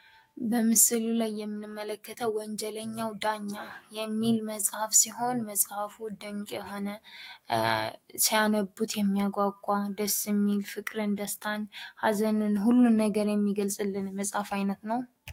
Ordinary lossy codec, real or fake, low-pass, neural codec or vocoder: AAC, 48 kbps; fake; 19.8 kHz; autoencoder, 48 kHz, 128 numbers a frame, DAC-VAE, trained on Japanese speech